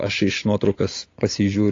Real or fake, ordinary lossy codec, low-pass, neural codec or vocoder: real; AAC, 32 kbps; 7.2 kHz; none